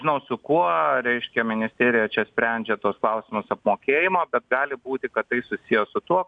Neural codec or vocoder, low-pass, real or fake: none; 9.9 kHz; real